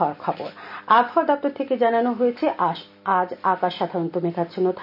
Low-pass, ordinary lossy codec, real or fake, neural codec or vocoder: 5.4 kHz; none; real; none